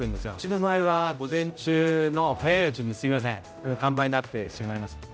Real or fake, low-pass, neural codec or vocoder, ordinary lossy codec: fake; none; codec, 16 kHz, 0.5 kbps, X-Codec, HuBERT features, trained on general audio; none